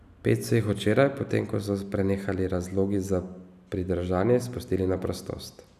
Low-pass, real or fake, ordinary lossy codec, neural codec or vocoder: 14.4 kHz; real; none; none